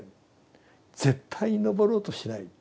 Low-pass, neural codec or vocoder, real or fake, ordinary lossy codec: none; none; real; none